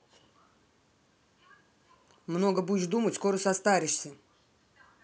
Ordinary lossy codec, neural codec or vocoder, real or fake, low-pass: none; none; real; none